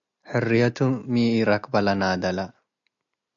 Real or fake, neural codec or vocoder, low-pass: real; none; 7.2 kHz